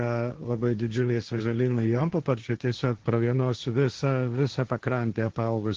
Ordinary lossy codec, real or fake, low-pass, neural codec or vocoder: Opus, 16 kbps; fake; 7.2 kHz; codec, 16 kHz, 1.1 kbps, Voila-Tokenizer